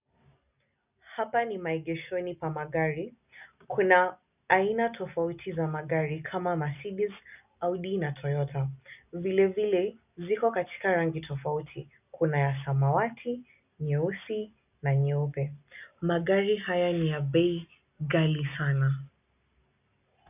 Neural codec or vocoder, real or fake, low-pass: none; real; 3.6 kHz